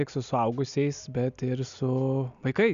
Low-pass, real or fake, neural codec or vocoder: 7.2 kHz; real; none